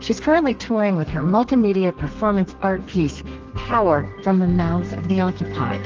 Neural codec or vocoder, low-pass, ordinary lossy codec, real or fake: codec, 32 kHz, 1.9 kbps, SNAC; 7.2 kHz; Opus, 24 kbps; fake